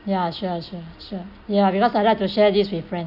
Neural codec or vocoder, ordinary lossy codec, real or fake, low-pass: none; none; real; 5.4 kHz